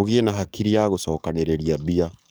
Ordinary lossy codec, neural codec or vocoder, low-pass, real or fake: none; codec, 44.1 kHz, 7.8 kbps, DAC; none; fake